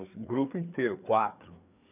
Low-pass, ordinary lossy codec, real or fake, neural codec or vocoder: 3.6 kHz; none; fake; codec, 16 kHz, 4 kbps, FreqCodec, larger model